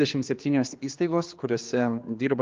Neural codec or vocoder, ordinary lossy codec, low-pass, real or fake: codec, 16 kHz, 2 kbps, X-Codec, HuBERT features, trained on general audio; Opus, 32 kbps; 7.2 kHz; fake